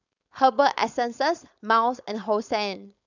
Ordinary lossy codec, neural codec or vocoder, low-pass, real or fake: none; codec, 16 kHz, 4.8 kbps, FACodec; 7.2 kHz; fake